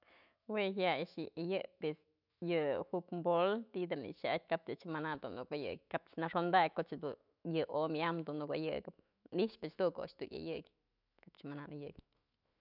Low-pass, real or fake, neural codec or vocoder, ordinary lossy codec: 5.4 kHz; fake; autoencoder, 48 kHz, 128 numbers a frame, DAC-VAE, trained on Japanese speech; none